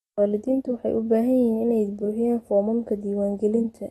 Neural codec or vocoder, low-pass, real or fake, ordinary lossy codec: none; 19.8 kHz; real; AAC, 32 kbps